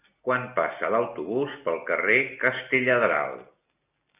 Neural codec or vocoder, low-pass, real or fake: none; 3.6 kHz; real